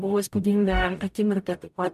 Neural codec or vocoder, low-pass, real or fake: codec, 44.1 kHz, 0.9 kbps, DAC; 14.4 kHz; fake